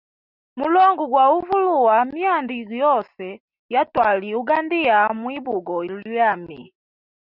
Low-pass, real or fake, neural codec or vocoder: 5.4 kHz; real; none